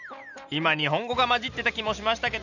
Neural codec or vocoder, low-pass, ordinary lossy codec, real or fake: none; 7.2 kHz; none; real